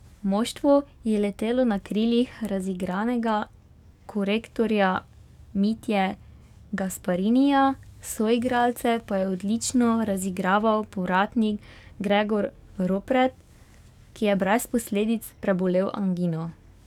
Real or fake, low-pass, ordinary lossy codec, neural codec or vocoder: fake; 19.8 kHz; none; codec, 44.1 kHz, 7.8 kbps, DAC